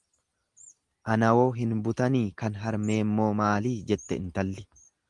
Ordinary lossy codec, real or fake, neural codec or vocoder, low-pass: Opus, 24 kbps; real; none; 10.8 kHz